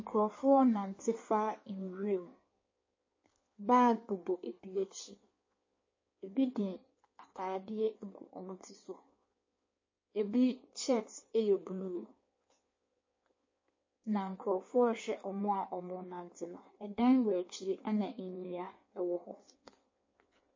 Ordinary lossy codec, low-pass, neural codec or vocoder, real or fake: MP3, 32 kbps; 7.2 kHz; codec, 16 kHz in and 24 kHz out, 1.1 kbps, FireRedTTS-2 codec; fake